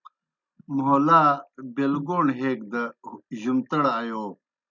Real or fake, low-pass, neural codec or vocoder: real; 7.2 kHz; none